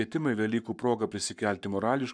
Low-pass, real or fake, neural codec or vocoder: 9.9 kHz; fake; codec, 44.1 kHz, 7.8 kbps, Pupu-Codec